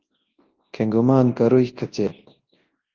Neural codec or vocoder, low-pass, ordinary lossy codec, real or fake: codec, 24 kHz, 0.9 kbps, WavTokenizer, large speech release; 7.2 kHz; Opus, 16 kbps; fake